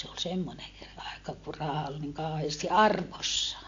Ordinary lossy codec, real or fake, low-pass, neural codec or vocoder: AAC, 48 kbps; real; 7.2 kHz; none